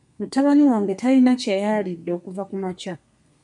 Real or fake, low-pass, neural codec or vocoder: fake; 10.8 kHz; codec, 32 kHz, 1.9 kbps, SNAC